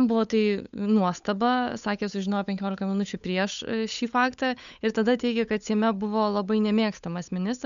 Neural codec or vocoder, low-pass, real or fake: codec, 16 kHz, 8 kbps, FunCodec, trained on Chinese and English, 25 frames a second; 7.2 kHz; fake